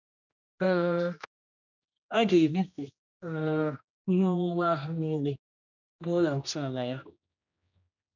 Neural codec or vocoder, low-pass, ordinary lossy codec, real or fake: codec, 16 kHz, 1 kbps, X-Codec, HuBERT features, trained on general audio; 7.2 kHz; none; fake